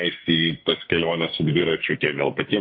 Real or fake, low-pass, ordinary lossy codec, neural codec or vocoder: fake; 5.4 kHz; MP3, 32 kbps; codec, 44.1 kHz, 2.6 kbps, SNAC